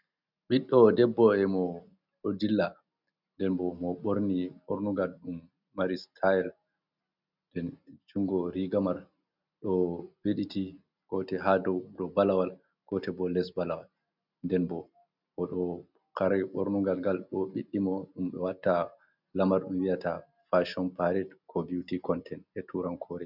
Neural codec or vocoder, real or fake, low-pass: none; real; 5.4 kHz